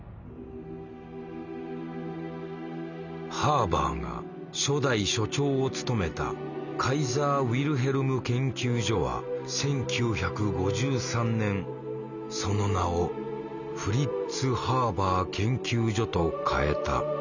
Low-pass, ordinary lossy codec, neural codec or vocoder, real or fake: 7.2 kHz; none; none; real